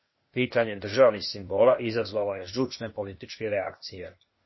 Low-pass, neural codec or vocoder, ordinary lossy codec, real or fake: 7.2 kHz; codec, 16 kHz, 0.8 kbps, ZipCodec; MP3, 24 kbps; fake